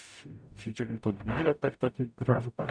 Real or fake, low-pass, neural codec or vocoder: fake; 9.9 kHz; codec, 44.1 kHz, 0.9 kbps, DAC